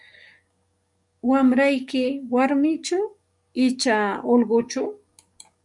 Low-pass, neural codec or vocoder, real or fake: 10.8 kHz; codec, 44.1 kHz, 7.8 kbps, DAC; fake